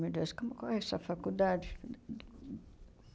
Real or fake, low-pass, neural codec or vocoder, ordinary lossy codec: real; none; none; none